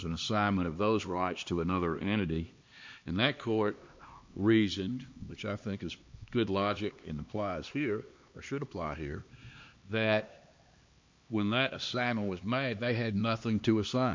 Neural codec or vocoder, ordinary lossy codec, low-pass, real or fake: codec, 16 kHz, 2 kbps, X-Codec, HuBERT features, trained on LibriSpeech; MP3, 48 kbps; 7.2 kHz; fake